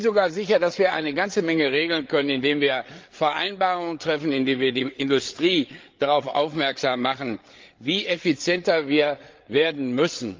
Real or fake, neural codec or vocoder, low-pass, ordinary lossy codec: fake; codec, 16 kHz, 8 kbps, FreqCodec, larger model; 7.2 kHz; Opus, 32 kbps